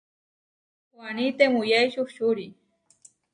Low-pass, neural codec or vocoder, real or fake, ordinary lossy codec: 9.9 kHz; none; real; AAC, 48 kbps